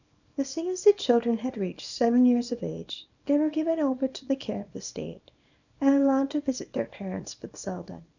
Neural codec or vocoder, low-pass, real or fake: codec, 24 kHz, 0.9 kbps, WavTokenizer, small release; 7.2 kHz; fake